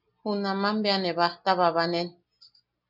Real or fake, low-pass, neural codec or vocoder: real; 5.4 kHz; none